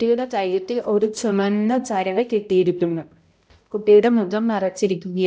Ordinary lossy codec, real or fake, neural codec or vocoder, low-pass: none; fake; codec, 16 kHz, 0.5 kbps, X-Codec, HuBERT features, trained on balanced general audio; none